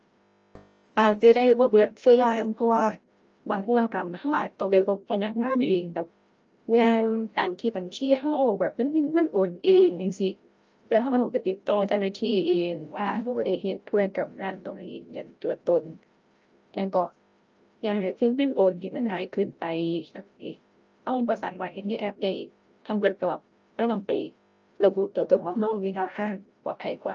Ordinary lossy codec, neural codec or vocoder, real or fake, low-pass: Opus, 24 kbps; codec, 16 kHz, 0.5 kbps, FreqCodec, larger model; fake; 7.2 kHz